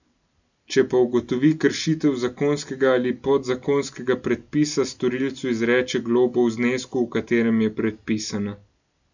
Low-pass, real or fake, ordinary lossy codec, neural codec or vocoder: 7.2 kHz; real; none; none